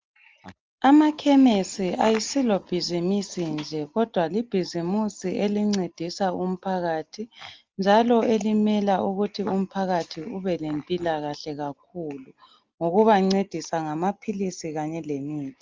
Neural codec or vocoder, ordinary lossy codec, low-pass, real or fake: none; Opus, 32 kbps; 7.2 kHz; real